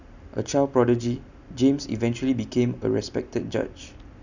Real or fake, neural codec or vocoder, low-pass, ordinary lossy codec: real; none; 7.2 kHz; none